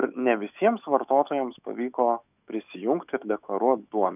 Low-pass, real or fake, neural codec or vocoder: 3.6 kHz; fake; autoencoder, 48 kHz, 128 numbers a frame, DAC-VAE, trained on Japanese speech